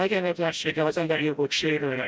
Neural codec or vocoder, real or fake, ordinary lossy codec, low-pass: codec, 16 kHz, 0.5 kbps, FreqCodec, smaller model; fake; none; none